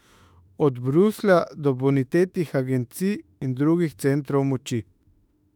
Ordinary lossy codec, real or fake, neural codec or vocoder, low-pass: none; fake; autoencoder, 48 kHz, 32 numbers a frame, DAC-VAE, trained on Japanese speech; 19.8 kHz